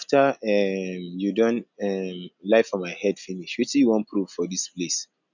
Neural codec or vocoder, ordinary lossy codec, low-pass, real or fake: none; none; 7.2 kHz; real